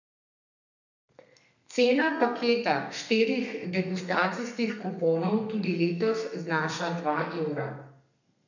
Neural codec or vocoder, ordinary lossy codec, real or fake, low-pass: codec, 32 kHz, 1.9 kbps, SNAC; none; fake; 7.2 kHz